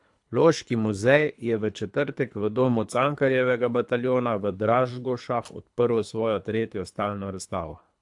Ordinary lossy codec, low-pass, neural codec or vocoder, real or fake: AAC, 64 kbps; 10.8 kHz; codec, 24 kHz, 3 kbps, HILCodec; fake